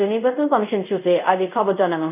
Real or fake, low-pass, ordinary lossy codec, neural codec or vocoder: fake; 3.6 kHz; none; codec, 24 kHz, 0.5 kbps, DualCodec